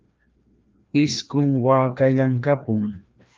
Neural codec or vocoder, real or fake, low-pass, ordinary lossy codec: codec, 16 kHz, 1 kbps, FreqCodec, larger model; fake; 7.2 kHz; Opus, 24 kbps